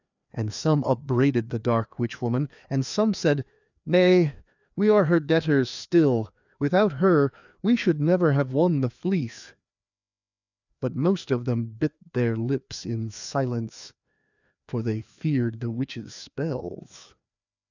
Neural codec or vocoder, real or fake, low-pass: codec, 16 kHz, 2 kbps, FreqCodec, larger model; fake; 7.2 kHz